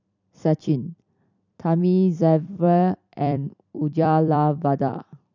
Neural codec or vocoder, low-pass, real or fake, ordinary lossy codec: vocoder, 44.1 kHz, 128 mel bands every 256 samples, BigVGAN v2; 7.2 kHz; fake; none